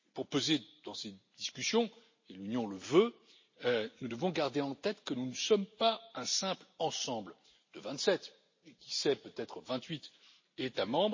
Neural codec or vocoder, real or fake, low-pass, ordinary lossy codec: none; real; 7.2 kHz; MP3, 32 kbps